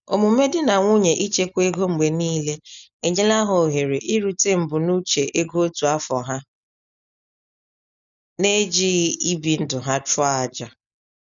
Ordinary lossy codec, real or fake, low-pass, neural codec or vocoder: none; real; 7.2 kHz; none